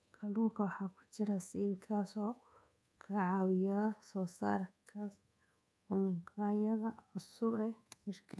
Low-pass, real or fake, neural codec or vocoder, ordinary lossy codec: none; fake; codec, 24 kHz, 1.2 kbps, DualCodec; none